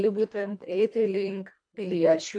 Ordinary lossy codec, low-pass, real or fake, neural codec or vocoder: MP3, 64 kbps; 9.9 kHz; fake; codec, 24 kHz, 1.5 kbps, HILCodec